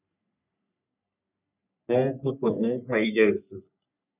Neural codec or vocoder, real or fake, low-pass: codec, 44.1 kHz, 3.4 kbps, Pupu-Codec; fake; 3.6 kHz